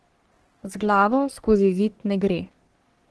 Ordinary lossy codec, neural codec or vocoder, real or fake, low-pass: Opus, 16 kbps; codec, 44.1 kHz, 3.4 kbps, Pupu-Codec; fake; 10.8 kHz